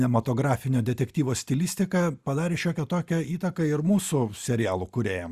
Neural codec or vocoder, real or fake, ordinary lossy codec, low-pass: none; real; Opus, 64 kbps; 14.4 kHz